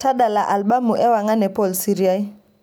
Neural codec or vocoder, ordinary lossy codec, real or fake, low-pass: none; none; real; none